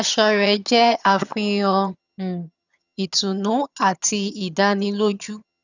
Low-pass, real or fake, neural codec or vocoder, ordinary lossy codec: 7.2 kHz; fake; vocoder, 22.05 kHz, 80 mel bands, HiFi-GAN; none